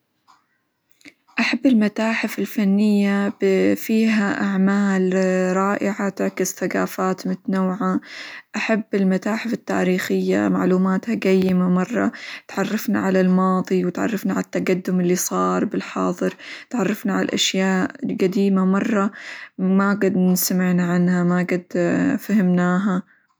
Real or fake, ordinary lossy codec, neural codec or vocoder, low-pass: real; none; none; none